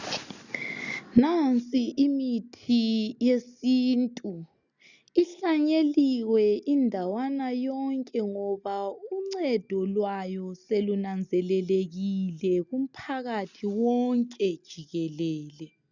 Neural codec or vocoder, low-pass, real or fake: none; 7.2 kHz; real